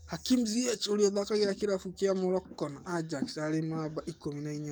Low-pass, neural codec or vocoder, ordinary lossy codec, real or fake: none; codec, 44.1 kHz, 7.8 kbps, DAC; none; fake